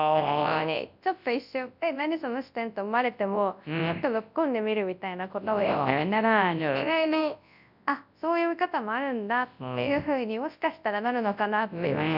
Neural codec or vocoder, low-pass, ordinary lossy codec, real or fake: codec, 24 kHz, 0.9 kbps, WavTokenizer, large speech release; 5.4 kHz; none; fake